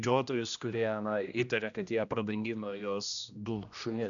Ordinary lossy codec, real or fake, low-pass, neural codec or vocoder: MP3, 96 kbps; fake; 7.2 kHz; codec, 16 kHz, 1 kbps, X-Codec, HuBERT features, trained on general audio